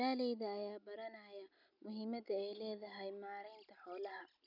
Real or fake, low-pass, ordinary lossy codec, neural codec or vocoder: real; 5.4 kHz; none; none